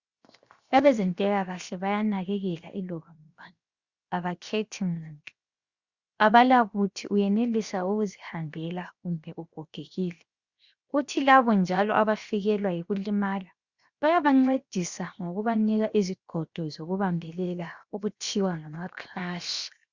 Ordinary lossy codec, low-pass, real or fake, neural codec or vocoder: Opus, 64 kbps; 7.2 kHz; fake; codec, 16 kHz, 0.7 kbps, FocalCodec